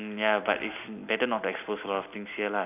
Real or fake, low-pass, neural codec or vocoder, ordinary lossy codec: real; 3.6 kHz; none; none